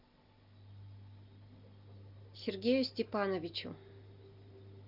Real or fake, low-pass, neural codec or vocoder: real; 5.4 kHz; none